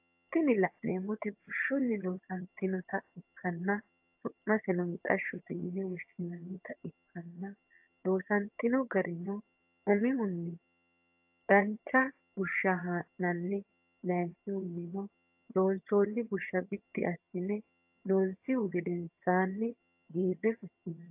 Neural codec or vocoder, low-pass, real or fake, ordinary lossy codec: vocoder, 22.05 kHz, 80 mel bands, HiFi-GAN; 3.6 kHz; fake; AAC, 32 kbps